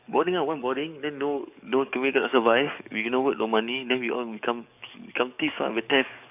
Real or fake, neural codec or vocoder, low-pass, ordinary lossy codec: fake; codec, 44.1 kHz, 7.8 kbps, DAC; 3.6 kHz; none